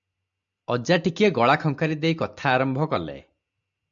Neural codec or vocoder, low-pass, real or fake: none; 7.2 kHz; real